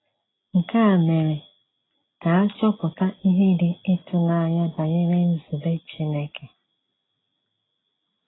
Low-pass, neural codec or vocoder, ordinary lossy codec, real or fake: 7.2 kHz; none; AAC, 16 kbps; real